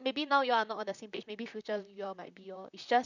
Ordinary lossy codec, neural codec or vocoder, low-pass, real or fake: none; vocoder, 44.1 kHz, 128 mel bands, Pupu-Vocoder; 7.2 kHz; fake